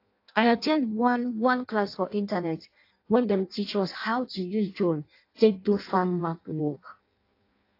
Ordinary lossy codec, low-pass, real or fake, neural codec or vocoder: AAC, 32 kbps; 5.4 kHz; fake; codec, 16 kHz in and 24 kHz out, 0.6 kbps, FireRedTTS-2 codec